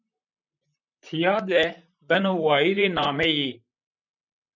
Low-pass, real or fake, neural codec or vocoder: 7.2 kHz; fake; vocoder, 44.1 kHz, 128 mel bands, Pupu-Vocoder